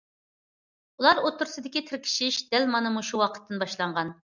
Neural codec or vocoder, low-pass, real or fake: none; 7.2 kHz; real